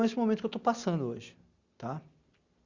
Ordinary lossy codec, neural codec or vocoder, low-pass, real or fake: Opus, 64 kbps; none; 7.2 kHz; real